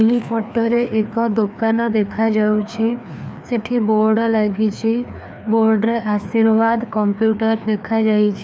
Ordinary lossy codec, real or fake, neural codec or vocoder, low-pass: none; fake; codec, 16 kHz, 2 kbps, FreqCodec, larger model; none